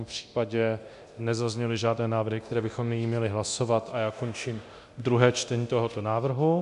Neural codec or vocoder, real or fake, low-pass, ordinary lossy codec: codec, 24 kHz, 0.9 kbps, DualCodec; fake; 10.8 kHz; MP3, 96 kbps